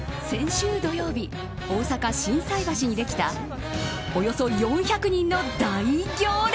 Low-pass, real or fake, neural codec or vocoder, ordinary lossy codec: none; real; none; none